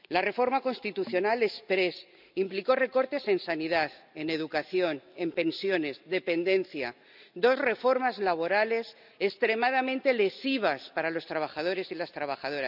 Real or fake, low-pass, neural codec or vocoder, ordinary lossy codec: real; 5.4 kHz; none; none